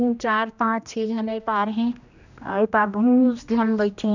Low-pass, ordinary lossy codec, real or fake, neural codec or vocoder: 7.2 kHz; none; fake; codec, 16 kHz, 1 kbps, X-Codec, HuBERT features, trained on general audio